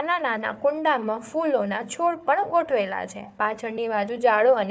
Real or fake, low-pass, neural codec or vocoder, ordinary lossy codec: fake; none; codec, 16 kHz, 4 kbps, FunCodec, trained on Chinese and English, 50 frames a second; none